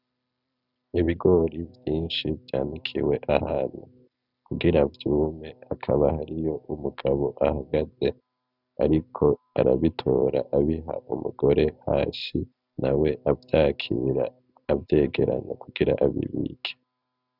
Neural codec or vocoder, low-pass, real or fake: none; 5.4 kHz; real